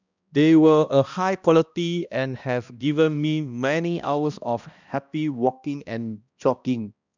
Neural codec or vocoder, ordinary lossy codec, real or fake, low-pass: codec, 16 kHz, 1 kbps, X-Codec, HuBERT features, trained on balanced general audio; none; fake; 7.2 kHz